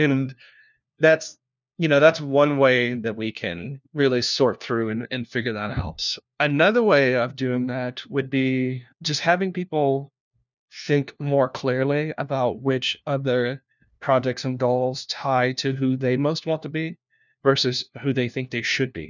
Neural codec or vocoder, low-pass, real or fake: codec, 16 kHz, 1 kbps, FunCodec, trained on LibriTTS, 50 frames a second; 7.2 kHz; fake